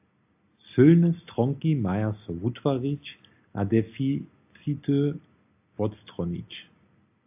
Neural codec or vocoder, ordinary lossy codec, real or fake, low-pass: none; AAC, 32 kbps; real; 3.6 kHz